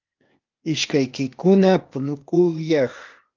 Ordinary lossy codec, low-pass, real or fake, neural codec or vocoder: Opus, 32 kbps; 7.2 kHz; fake; codec, 16 kHz, 0.8 kbps, ZipCodec